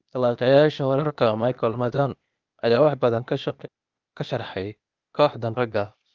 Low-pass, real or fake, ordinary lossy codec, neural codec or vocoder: 7.2 kHz; fake; Opus, 24 kbps; codec, 16 kHz, 0.8 kbps, ZipCodec